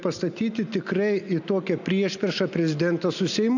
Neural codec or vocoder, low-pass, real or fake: none; 7.2 kHz; real